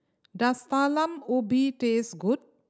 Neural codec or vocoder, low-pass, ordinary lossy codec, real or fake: none; none; none; real